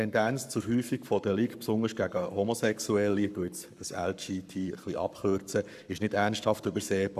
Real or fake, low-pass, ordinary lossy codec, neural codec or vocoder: fake; 14.4 kHz; none; codec, 44.1 kHz, 7.8 kbps, Pupu-Codec